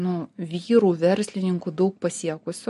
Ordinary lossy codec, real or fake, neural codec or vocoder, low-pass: MP3, 48 kbps; real; none; 14.4 kHz